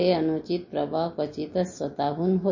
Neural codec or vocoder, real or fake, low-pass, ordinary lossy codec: none; real; 7.2 kHz; MP3, 32 kbps